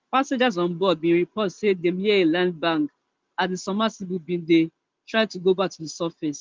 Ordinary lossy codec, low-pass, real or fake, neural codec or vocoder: Opus, 32 kbps; 7.2 kHz; real; none